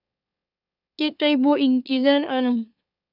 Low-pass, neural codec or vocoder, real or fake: 5.4 kHz; autoencoder, 44.1 kHz, a latent of 192 numbers a frame, MeloTTS; fake